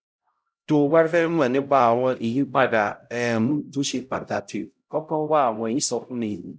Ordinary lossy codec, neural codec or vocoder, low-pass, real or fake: none; codec, 16 kHz, 0.5 kbps, X-Codec, HuBERT features, trained on LibriSpeech; none; fake